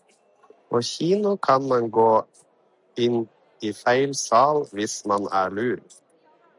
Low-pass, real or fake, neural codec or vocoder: 10.8 kHz; real; none